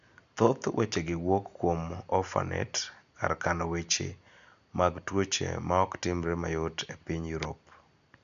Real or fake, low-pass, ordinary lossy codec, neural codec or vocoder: real; 7.2 kHz; none; none